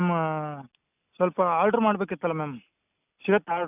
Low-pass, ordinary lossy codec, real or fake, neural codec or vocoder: 3.6 kHz; none; real; none